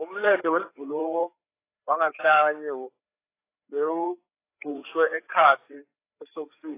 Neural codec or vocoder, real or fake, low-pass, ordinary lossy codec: codec, 16 kHz, 4 kbps, FreqCodec, larger model; fake; 3.6 kHz; AAC, 24 kbps